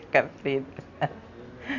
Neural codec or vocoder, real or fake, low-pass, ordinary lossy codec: none; real; 7.2 kHz; none